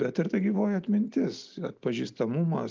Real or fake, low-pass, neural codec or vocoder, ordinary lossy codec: real; 7.2 kHz; none; Opus, 32 kbps